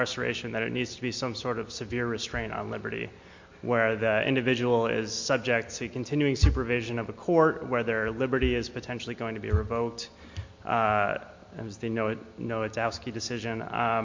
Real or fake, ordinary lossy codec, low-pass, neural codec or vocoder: real; MP3, 48 kbps; 7.2 kHz; none